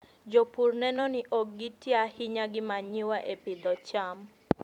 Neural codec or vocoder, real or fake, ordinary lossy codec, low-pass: vocoder, 44.1 kHz, 128 mel bands every 256 samples, BigVGAN v2; fake; none; 19.8 kHz